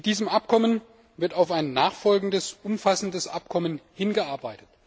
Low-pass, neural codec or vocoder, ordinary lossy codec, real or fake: none; none; none; real